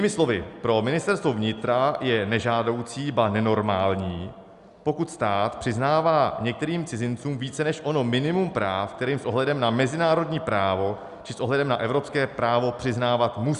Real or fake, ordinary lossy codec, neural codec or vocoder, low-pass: real; Opus, 64 kbps; none; 10.8 kHz